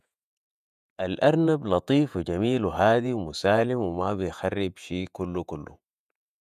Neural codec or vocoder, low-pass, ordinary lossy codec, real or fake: vocoder, 48 kHz, 128 mel bands, Vocos; 14.4 kHz; none; fake